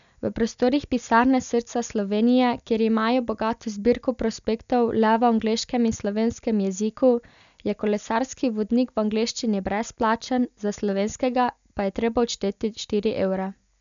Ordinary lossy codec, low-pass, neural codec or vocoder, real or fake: none; 7.2 kHz; none; real